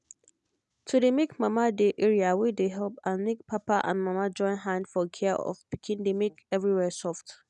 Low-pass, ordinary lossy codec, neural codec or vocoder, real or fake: 10.8 kHz; none; none; real